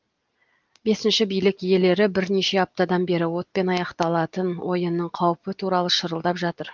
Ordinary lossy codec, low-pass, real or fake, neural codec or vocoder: Opus, 32 kbps; 7.2 kHz; real; none